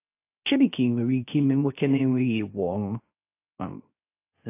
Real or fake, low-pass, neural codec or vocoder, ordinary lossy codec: fake; 3.6 kHz; codec, 16 kHz, 0.3 kbps, FocalCodec; AAC, 24 kbps